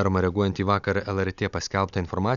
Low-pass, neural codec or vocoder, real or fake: 7.2 kHz; none; real